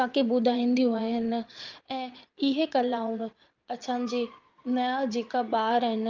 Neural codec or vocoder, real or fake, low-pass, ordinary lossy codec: vocoder, 22.05 kHz, 80 mel bands, Vocos; fake; 7.2 kHz; Opus, 24 kbps